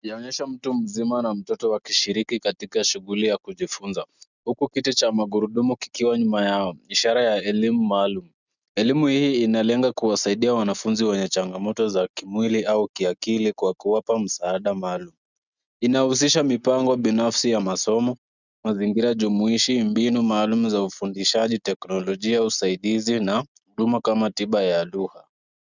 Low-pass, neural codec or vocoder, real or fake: 7.2 kHz; none; real